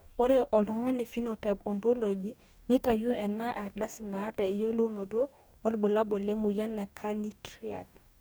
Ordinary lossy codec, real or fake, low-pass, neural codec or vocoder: none; fake; none; codec, 44.1 kHz, 2.6 kbps, DAC